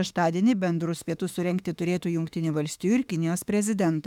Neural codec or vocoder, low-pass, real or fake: autoencoder, 48 kHz, 32 numbers a frame, DAC-VAE, trained on Japanese speech; 19.8 kHz; fake